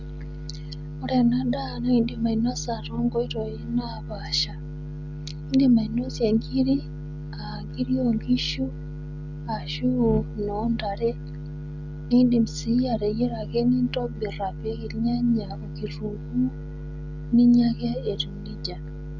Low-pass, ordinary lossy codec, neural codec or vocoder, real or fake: 7.2 kHz; none; none; real